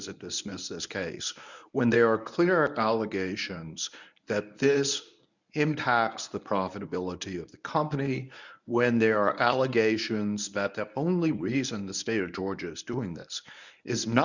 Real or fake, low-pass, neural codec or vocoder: fake; 7.2 kHz; codec, 24 kHz, 0.9 kbps, WavTokenizer, medium speech release version 1